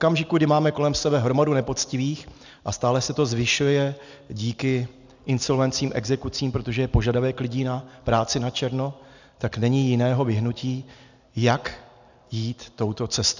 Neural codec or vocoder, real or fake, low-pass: none; real; 7.2 kHz